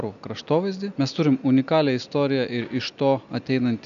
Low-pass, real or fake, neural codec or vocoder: 7.2 kHz; real; none